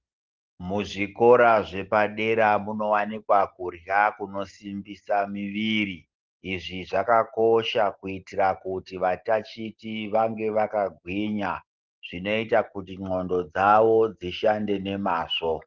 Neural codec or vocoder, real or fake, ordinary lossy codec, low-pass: none; real; Opus, 16 kbps; 7.2 kHz